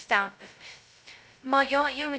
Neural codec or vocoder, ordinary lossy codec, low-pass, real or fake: codec, 16 kHz, 0.2 kbps, FocalCodec; none; none; fake